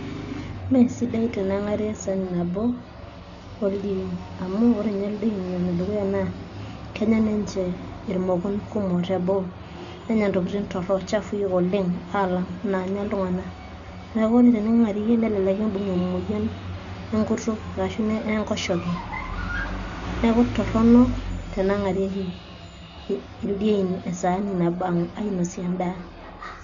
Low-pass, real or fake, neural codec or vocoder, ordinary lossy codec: 7.2 kHz; real; none; none